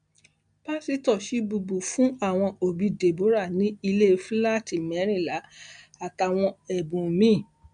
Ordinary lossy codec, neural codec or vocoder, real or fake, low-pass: MP3, 64 kbps; none; real; 9.9 kHz